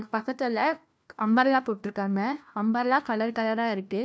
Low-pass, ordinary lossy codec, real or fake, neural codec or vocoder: none; none; fake; codec, 16 kHz, 1 kbps, FunCodec, trained on LibriTTS, 50 frames a second